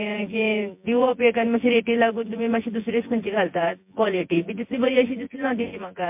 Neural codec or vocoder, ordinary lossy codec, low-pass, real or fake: vocoder, 24 kHz, 100 mel bands, Vocos; MP3, 32 kbps; 3.6 kHz; fake